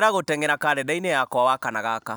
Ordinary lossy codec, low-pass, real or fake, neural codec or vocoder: none; none; real; none